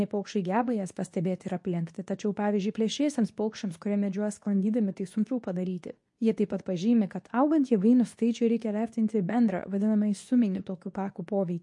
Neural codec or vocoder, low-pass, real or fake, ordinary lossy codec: codec, 24 kHz, 0.9 kbps, WavTokenizer, medium speech release version 2; 10.8 kHz; fake; MP3, 48 kbps